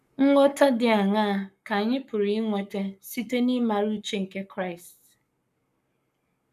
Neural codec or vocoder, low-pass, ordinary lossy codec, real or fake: codec, 44.1 kHz, 7.8 kbps, Pupu-Codec; 14.4 kHz; none; fake